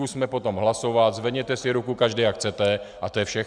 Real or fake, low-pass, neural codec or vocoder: real; 9.9 kHz; none